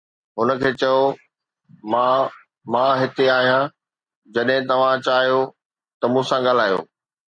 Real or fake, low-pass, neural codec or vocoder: real; 9.9 kHz; none